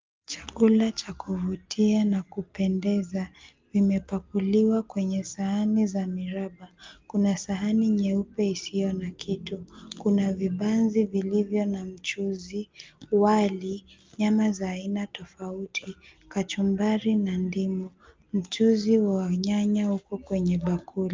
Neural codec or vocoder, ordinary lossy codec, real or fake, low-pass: none; Opus, 24 kbps; real; 7.2 kHz